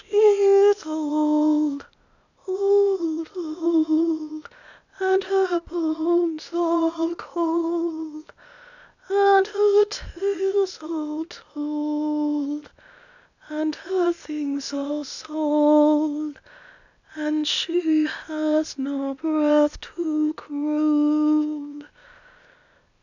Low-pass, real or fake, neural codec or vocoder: 7.2 kHz; fake; codec, 16 kHz, 0.8 kbps, ZipCodec